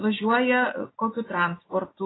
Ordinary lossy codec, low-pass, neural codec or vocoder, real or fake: AAC, 16 kbps; 7.2 kHz; none; real